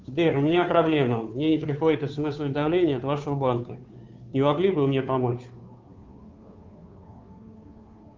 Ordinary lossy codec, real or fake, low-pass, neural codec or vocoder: Opus, 24 kbps; fake; 7.2 kHz; codec, 16 kHz, 2 kbps, FunCodec, trained on Chinese and English, 25 frames a second